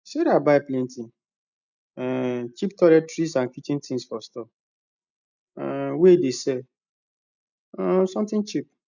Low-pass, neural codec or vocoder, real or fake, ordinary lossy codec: 7.2 kHz; none; real; none